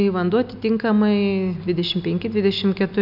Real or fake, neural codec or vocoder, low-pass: real; none; 5.4 kHz